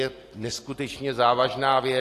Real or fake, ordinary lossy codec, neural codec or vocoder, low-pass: real; AAC, 48 kbps; none; 14.4 kHz